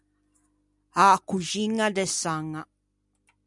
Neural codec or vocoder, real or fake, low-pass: none; real; 10.8 kHz